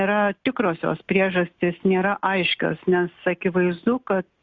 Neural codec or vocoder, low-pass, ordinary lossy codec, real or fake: none; 7.2 kHz; Opus, 64 kbps; real